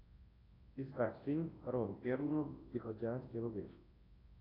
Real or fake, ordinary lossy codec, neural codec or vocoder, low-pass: fake; AAC, 24 kbps; codec, 24 kHz, 0.9 kbps, WavTokenizer, large speech release; 5.4 kHz